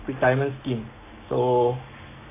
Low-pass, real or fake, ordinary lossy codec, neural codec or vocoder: 3.6 kHz; fake; AAC, 24 kbps; codec, 44.1 kHz, 7.8 kbps, Pupu-Codec